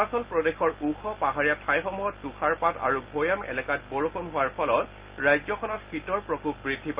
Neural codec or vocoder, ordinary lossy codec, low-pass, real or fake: none; Opus, 32 kbps; 3.6 kHz; real